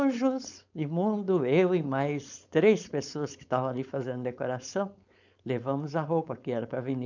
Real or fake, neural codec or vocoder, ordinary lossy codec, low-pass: fake; codec, 16 kHz, 4.8 kbps, FACodec; none; 7.2 kHz